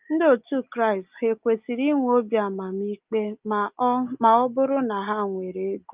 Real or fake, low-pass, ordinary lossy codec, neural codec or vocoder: real; 3.6 kHz; Opus, 24 kbps; none